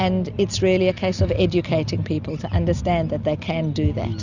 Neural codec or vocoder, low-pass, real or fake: none; 7.2 kHz; real